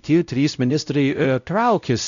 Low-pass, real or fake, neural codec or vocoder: 7.2 kHz; fake; codec, 16 kHz, 0.5 kbps, X-Codec, WavLM features, trained on Multilingual LibriSpeech